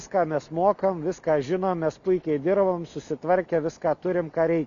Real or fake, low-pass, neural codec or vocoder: real; 7.2 kHz; none